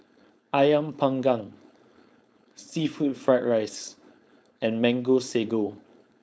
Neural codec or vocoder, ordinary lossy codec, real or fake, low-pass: codec, 16 kHz, 4.8 kbps, FACodec; none; fake; none